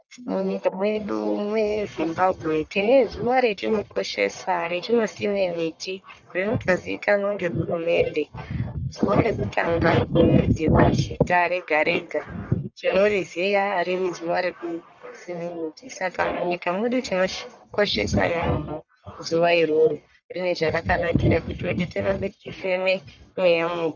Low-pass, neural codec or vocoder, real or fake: 7.2 kHz; codec, 44.1 kHz, 1.7 kbps, Pupu-Codec; fake